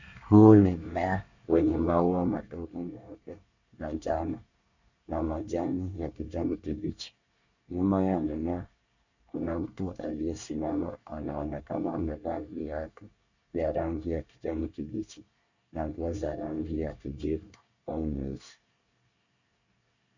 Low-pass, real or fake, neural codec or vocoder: 7.2 kHz; fake; codec, 24 kHz, 1 kbps, SNAC